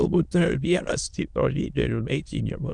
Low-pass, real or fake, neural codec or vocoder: 9.9 kHz; fake; autoencoder, 22.05 kHz, a latent of 192 numbers a frame, VITS, trained on many speakers